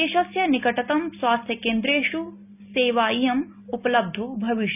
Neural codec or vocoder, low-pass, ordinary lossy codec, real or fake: none; 3.6 kHz; none; real